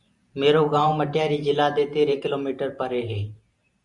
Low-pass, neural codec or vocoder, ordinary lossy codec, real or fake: 10.8 kHz; vocoder, 44.1 kHz, 128 mel bands every 512 samples, BigVGAN v2; Opus, 64 kbps; fake